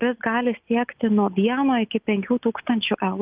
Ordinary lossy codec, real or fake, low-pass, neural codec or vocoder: Opus, 24 kbps; real; 3.6 kHz; none